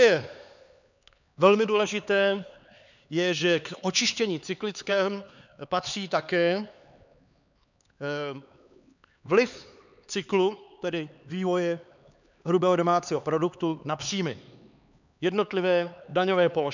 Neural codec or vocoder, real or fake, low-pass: codec, 16 kHz, 4 kbps, X-Codec, HuBERT features, trained on LibriSpeech; fake; 7.2 kHz